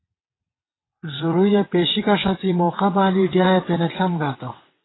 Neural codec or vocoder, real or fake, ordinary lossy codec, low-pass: vocoder, 22.05 kHz, 80 mel bands, WaveNeXt; fake; AAC, 16 kbps; 7.2 kHz